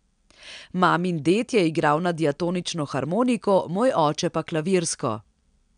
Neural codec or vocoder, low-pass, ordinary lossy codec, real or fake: none; 9.9 kHz; none; real